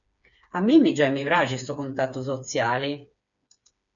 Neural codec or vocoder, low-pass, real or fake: codec, 16 kHz, 4 kbps, FreqCodec, smaller model; 7.2 kHz; fake